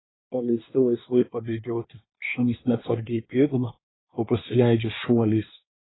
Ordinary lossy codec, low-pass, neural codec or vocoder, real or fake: AAC, 16 kbps; 7.2 kHz; codec, 24 kHz, 1 kbps, SNAC; fake